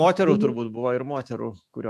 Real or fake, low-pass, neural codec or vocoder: fake; 14.4 kHz; autoencoder, 48 kHz, 128 numbers a frame, DAC-VAE, trained on Japanese speech